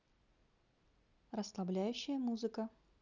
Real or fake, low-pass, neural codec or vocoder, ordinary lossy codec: real; 7.2 kHz; none; none